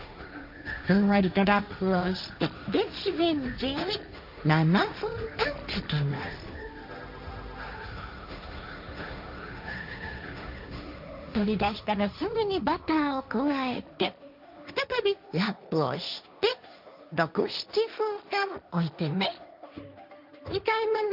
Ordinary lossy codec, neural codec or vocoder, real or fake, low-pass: none; codec, 16 kHz, 1.1 kbps, Voila-Tokenizer; fake; 5.4 kHz